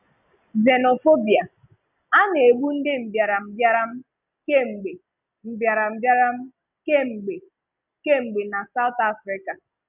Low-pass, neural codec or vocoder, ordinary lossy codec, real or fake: 3.6 kHz; none; none; real